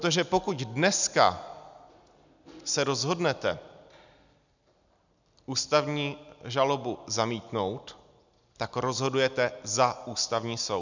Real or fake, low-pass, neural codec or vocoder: real; 7.2 kHz; none